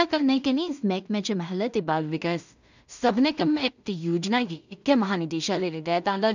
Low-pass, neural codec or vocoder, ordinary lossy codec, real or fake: 7.2 kHz; codec, 16 kHz in and 24 kHz out, 0.4 kbps, LongCat-Audio-Codec, two codebook decoder; none; fake